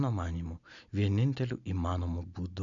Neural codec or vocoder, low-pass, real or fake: none; 7.2 kHz; real